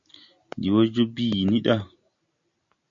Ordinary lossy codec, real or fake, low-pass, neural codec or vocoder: MP3, 48 kbps; real; 7.2 kHz; none